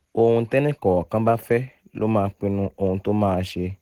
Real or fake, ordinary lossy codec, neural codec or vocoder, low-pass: real; Opus, 16 kbps; none; 19.8 kHz